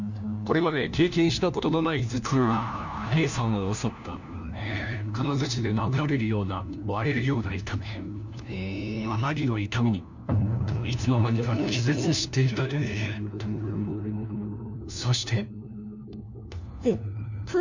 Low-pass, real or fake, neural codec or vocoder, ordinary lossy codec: 7.2 kHz; fake; codec, 16 kHz, 1 kbps, FunCodec, trained on LibriTTS, 50 frames a second; none